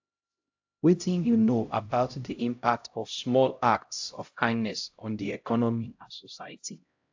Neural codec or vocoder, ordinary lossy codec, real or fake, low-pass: codec, 16 kHz, 0.5 kbps, X-Codec, HuBERT features, trained on LibriSpeech; AAC, 48 kbps; fake; 7.2 kHz